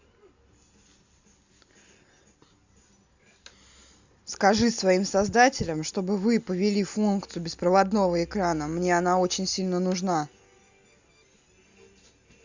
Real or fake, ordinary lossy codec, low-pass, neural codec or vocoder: real; Opus, 64 kbps; 7.2 kHz; none